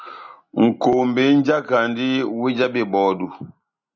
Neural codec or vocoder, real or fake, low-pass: none; real; 7.2 kHz